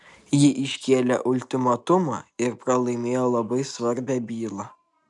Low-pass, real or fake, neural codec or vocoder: 10.8 kHz; real; none